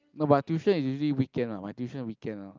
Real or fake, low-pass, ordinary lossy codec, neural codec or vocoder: real; 7.2 kHz; Opus, 24 kbps; none